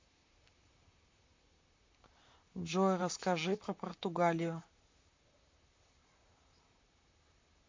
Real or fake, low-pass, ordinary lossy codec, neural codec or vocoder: fake; 7.2 kHz; MP3, 48 kbps; vocoder, 44.1 kHz, 128 mel bands, Pupu-Vocoder